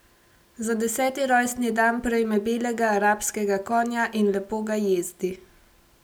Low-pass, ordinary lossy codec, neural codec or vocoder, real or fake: none; none; none; real